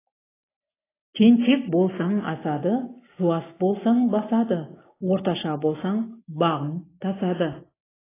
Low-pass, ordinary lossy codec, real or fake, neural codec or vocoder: 3.6 kHz; AAC, 16 kbps; real; none